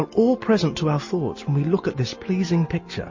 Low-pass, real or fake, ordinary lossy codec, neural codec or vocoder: 7.2 kHz; real; MP3, 32 kbps; none